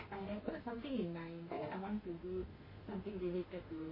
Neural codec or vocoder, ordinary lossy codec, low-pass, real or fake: autoencoder, 48 kHz, 32 numbers a frame, DAC-VAE, trained on Japanese speech; MP3, 24 kbps; 5.4 kHz; fake